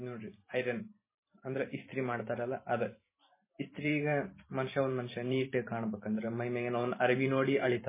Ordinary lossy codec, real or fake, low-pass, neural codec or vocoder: MP3, 16 kbps; fake; 3.6 kHz; vocoder, 44.1 kHz, 128 mel bands every 512 samples, BigVGAN v2